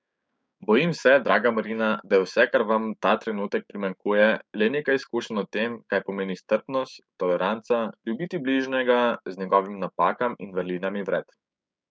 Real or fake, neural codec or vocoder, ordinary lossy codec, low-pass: fake; codec, 16 kHz, 6 kbps, DAC; none; none